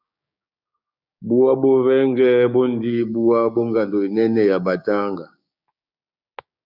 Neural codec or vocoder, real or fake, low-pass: codec, 16 kHz, 6 kbps, DAC; fake; 5.4 kHz